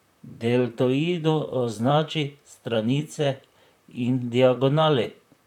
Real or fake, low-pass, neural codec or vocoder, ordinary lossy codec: fake; 19.8 kHz; vocoder, 44.1 kHz, 128 mel bands, Pupu-Vocoder; none